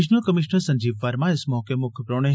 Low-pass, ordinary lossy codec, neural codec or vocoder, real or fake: none; none; none; real